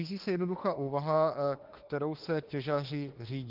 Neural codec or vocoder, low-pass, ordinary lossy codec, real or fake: autoencoder, 48 kHz, 32 numbers a frame, DAC-VAE, trained on Japanese speech; 5.4 kHz; Opus, 16 kbps; fake